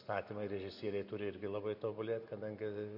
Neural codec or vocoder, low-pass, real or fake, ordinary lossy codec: none; 5.4 kHz; real; MP3, 48 kbps